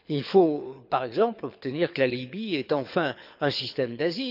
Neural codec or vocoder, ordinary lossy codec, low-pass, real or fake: codec, 24 kHz, 6 kbps, HILCodec; none; 5.4 kHz; fake